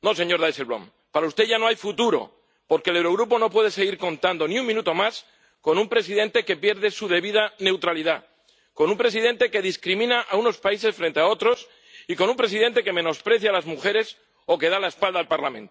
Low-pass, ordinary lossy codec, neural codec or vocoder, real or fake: none; none; none; real